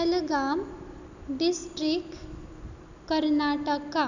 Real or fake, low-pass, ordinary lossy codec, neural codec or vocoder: real; 7.2 kHz; none; none